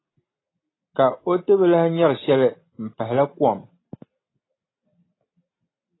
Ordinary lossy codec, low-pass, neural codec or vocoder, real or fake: AAC, 16 kbps; 7.2 kHz; none; real